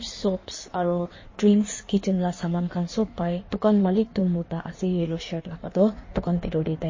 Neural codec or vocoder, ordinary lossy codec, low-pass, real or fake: codec, 16 kHz in and 24 kHz out, 1.1 kbps, FireRedTTS-2 codec; MP3, 32 kbps; 7.2 kHz; fake